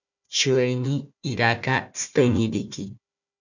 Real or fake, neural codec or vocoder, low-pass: fake; codec, 16 kHz, 1 kbps, FunCodec, trained on Chinese and English, 50 frames a second; 7.2 kHz